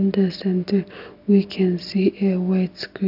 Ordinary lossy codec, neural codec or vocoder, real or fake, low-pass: AAC, 48 kbps; none; real; 5.4 kHz